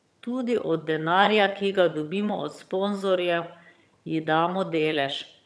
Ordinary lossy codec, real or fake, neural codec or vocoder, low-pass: none; fake; vocoder, 22.05 kHz, 80 mel bands, HiFi-GAN; none